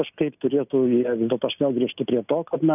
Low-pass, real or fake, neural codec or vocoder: 3.6 kHz; real; none